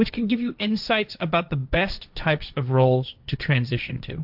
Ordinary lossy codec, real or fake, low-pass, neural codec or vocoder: AAC, 48 kbps; fake; 5.4 kHz; codec, 16 kHz, 1.1 kbps, Voila-Tokenizer